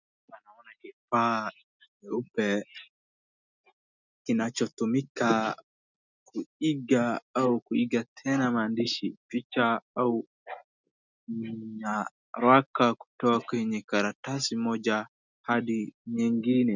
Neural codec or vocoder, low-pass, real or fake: none; 7.2 kHz; real